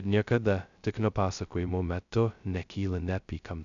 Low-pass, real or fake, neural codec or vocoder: 7.2 kHz; fake; codec, 16 kHz, 0.2 kbps, FocalCodec